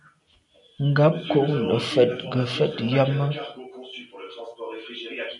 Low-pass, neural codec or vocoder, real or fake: 10.8 kHz; none; real